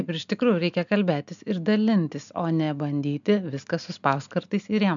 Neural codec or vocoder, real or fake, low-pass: none; real; 7.2 kHz